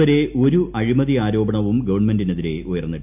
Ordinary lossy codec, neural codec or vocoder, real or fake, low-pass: none; none; real; 3.6 kHz